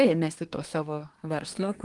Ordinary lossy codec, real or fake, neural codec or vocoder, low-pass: Opus, 24 kbps; fake; codec, 24 kHz, 1 kbps, SNAC; 10.8 kHz